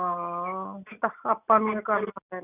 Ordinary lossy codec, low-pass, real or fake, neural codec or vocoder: none; 3.6 kHz; real; none